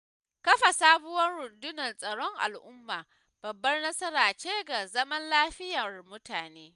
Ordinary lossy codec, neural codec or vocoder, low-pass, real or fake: none; none; 10.8 kHz; real